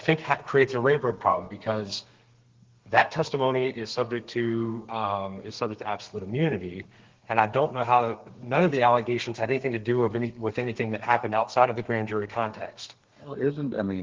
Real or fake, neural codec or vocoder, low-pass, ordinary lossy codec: fake; codec, 32 kHz, 1.9 kbps, SNAC; 7.2 kHz; Opus, 16 kbps